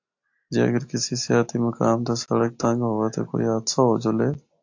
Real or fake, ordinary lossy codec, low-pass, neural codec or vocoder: real; AAC, 48 kbps; 7.2 kHz; none